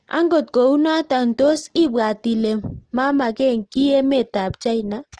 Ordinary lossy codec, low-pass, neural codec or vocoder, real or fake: Opus, 16 kbps; 9.9 kHz; none; real